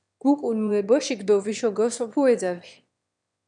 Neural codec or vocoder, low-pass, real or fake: autoencoder, 22.05 kHz, a latent of 192 numbers a frame, VITS, trained on one speaker; 9.9 kHz; fake